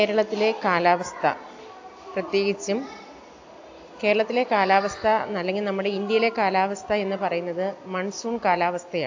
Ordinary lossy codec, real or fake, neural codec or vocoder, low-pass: AAC, 48 kbps; real; none; 7.2 kHz